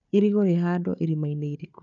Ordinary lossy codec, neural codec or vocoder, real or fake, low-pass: none; codec, 16 kHz, 4 kbps, FunCodec, trained on Chinese and English, 50 frames a second; fake; 7.2 kHz